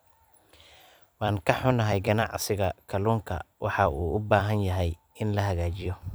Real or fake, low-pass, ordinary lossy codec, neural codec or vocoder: fake; none; none; vocoder, 44.1 kHz, 128 mel bands every 256 samples, BigVGAN v2